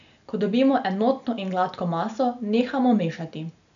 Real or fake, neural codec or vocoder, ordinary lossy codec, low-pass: real; none; none; 7.2 kHz